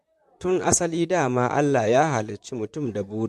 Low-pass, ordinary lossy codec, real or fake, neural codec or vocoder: 14.4 kHz; MP3, 64 kbps; fake; vocoder, 44.1 kHz, 128 mel bands, Pupu-Vocoder